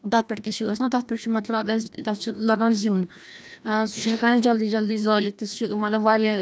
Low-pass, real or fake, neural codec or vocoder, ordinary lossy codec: none; fake; codec, 16 kHz, 1 kbps, FreqCodec, larger model; none